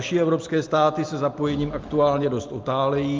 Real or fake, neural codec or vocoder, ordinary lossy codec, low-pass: real; none; Opus, 24 kbps; 7.2 kHz